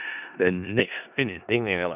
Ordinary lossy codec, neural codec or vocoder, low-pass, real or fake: none; codec, 16 kHz in and 24 kHz out, 0.4 kbps, LongCat-Audio-Codec, four codebook decoder; 3.6 kHz; fake